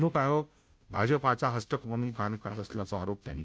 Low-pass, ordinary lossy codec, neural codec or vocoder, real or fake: none; none; codec, 16 kHz, 0.5 kbps, FunCodec, trained on Chinese and English, 25 frames a second; fake